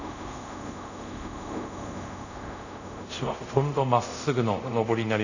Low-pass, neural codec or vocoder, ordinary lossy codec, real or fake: 7.2 kHz; codec, 24 kHz, 0.5 kbps, DualCodec; none; fake